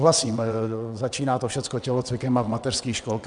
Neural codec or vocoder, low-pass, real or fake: vocoder, 22.05 kHz, 80 mel bands, WaveNeXt; 9.9 kHz; fake